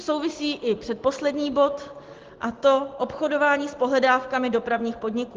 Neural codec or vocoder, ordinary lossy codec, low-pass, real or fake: none; Opus, 16 kbps; 7.2 kHz; real